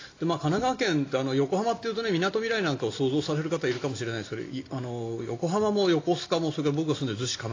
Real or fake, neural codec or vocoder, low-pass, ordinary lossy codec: real; none; 7.2 kHz; AAC, 32 kbps